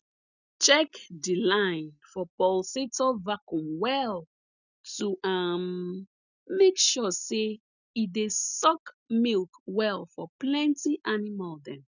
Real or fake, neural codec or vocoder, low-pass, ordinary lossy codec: real; none; 7.2 kHz; none